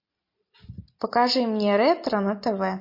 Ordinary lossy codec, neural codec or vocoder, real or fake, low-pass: MP3, 32 kbps; none; real; 5.4 kHz